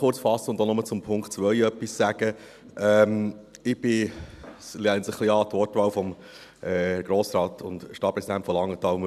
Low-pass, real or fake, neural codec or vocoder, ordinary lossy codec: 14.4 kHz; real; none; none